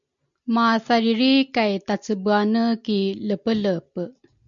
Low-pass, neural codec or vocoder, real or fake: 7.2 kHz; none; real